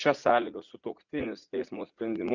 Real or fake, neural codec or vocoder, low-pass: fake; vocoder, 44.1 kHz, 80 mel bands, Vocos; 7.2 kHz